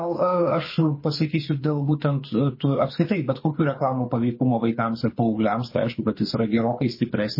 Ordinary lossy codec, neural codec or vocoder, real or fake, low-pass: MP3, 24 kbps; codec, 24 kHz, 6 kbps, HILCodec; fake; 5.4 kHz